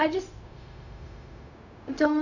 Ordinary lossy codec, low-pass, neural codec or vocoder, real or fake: none; 7.2 kHz; codec, 16 kHz, 0.4 kbps, LongCat-Audio-Codec; fake